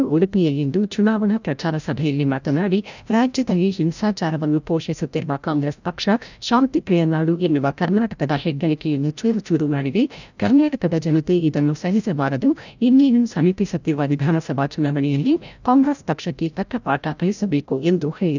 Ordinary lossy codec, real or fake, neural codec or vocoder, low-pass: none; fake; codec, 16 kHz, 0.5 kbps, FreqCodec, larger model; 7.2 kHz